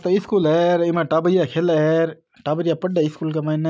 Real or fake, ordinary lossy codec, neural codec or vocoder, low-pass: real; none; none; none